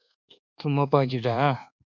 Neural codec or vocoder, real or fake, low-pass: codec, 24 kHz, 1.2 kbps, DualCodec; fake; 7.2 kHz